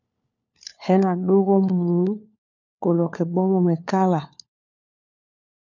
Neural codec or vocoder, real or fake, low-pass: codec, 16 kHz, 4 kbps, FunCodec, trained on LibriTTS, 50 frames a second; fake; 7.2 kHz